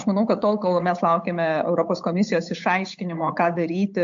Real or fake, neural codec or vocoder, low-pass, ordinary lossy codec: fake; codec, 16 kHz, 8 kbps, FunCodec, trained on Chinese and English, 25 frames a second; 7.2 kHz; MP3, 48 kbps